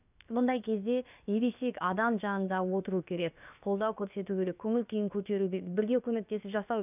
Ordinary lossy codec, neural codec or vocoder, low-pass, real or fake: none; codec, 16 kHz, about 1 kbps, DyCAST, with the encoder's durations; 3.6 kHz; fake